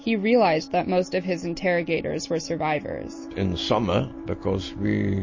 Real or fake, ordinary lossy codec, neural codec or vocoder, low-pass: real; MP3, 32 kbps; none; 7.2 kHz